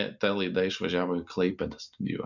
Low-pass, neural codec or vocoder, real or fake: 7.2 kHz; none; real